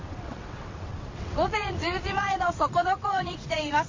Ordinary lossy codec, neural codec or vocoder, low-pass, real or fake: MP3, 32 kbps; vocoder, 22.05 kHz, 80 mel bands, WaveNeXt; 7.2 kHz; fake